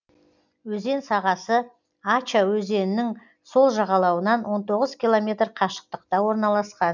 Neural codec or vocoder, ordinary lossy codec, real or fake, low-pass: none; none; real; 7.2 kHz